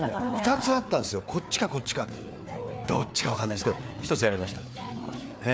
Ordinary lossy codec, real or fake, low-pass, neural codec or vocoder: none; fake; none; codec, 16 kHz, 4 kbps, FreqCodec, larger model